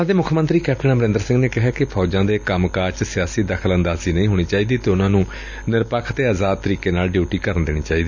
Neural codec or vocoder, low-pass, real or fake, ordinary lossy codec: none; 7.2 kHz; real; none